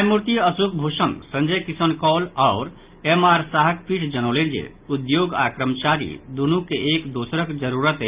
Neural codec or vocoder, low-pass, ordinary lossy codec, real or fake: none; 3.6 kHz; Opus, 32 kbps; real